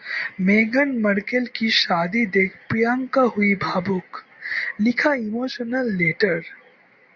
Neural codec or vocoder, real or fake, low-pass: none; real; 7.2 kHz